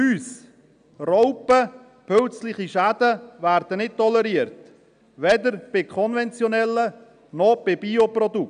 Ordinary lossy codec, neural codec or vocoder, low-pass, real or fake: none; none; 9.9 kHz; real